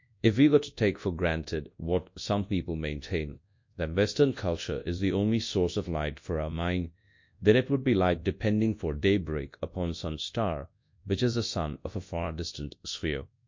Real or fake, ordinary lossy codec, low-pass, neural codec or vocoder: fake; MP3, 48 kbps; 7.2 kHz; codec, 24 kHz, 0.9 kbps, WavTokenizer, large speech release